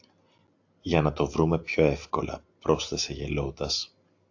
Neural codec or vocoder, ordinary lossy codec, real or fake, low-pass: none; AAC, 48 kbps; real; 7.2 kHz